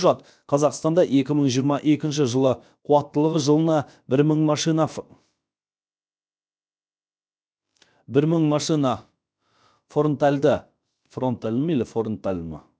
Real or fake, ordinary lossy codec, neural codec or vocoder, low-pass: fake; none; codec, 16 kHz, about 1 kbps, DyCAST, with the encoder's durations; none